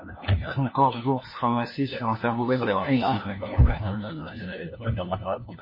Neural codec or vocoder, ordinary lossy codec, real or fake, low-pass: codec, 16 kHz, 1 kbps, FunCodec, trained on LibriTTS, 50 frames a second; MP3, 24 kbps; fake; 5.4 kHz